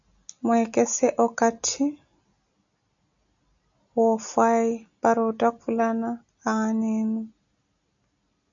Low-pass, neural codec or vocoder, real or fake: 7.2 kHz; none; real